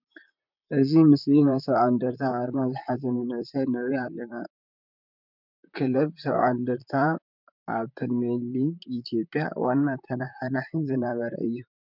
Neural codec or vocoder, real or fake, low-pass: vocoder, 44.1 kHz, 128 mel bands, Pupu-Vocoder; fake; 5.4 kHz